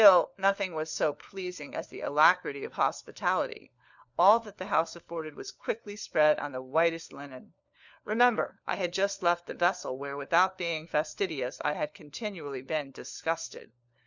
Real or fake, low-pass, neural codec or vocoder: fake; 7.2 kHz; codec, 16 kHz, 4 kbps, FunCodec, trained on LibriTTS, 50 frames a second